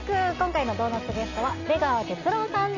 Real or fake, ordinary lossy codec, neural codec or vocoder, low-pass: real; none; none; 7.2 kHz